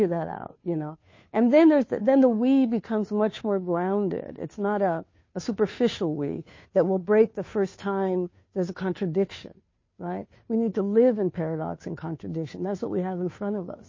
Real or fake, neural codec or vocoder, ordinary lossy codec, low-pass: fake; codec, 16 kHz, 2 kbps, FunCodec, trained on Chinese and English, 25 frames a second; MP3, 32 kbps; 7.2 kHz